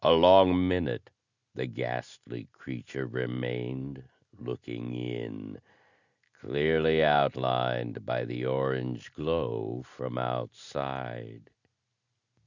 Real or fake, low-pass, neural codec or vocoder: real; 7.2 kHz; none